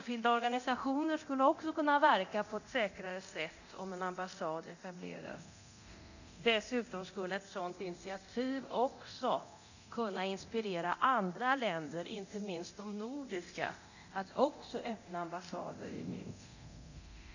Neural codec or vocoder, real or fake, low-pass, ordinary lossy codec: codec, 24 kHz, 0.9 kbps, DualCodec; fake; 7.2 kHz; none